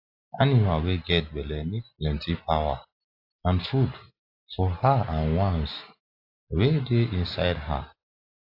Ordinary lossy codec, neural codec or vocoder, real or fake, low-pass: AAC, 32 kbps; none; real; 5.4 kHz